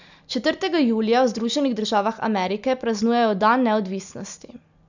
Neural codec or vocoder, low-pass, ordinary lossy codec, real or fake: none; 7.2 kHz; none; real